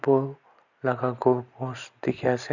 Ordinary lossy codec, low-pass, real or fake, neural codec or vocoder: none; 7.2 kHz; real; none